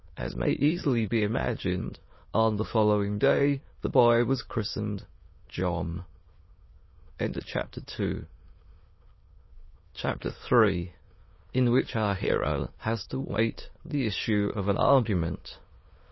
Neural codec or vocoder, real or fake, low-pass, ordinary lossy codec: autoencoder, 22.05 kHz, a latent of 192 numbers a frame, VITS, trained on many speakers; fake; 7.2 kHz; MP3, 24 kbps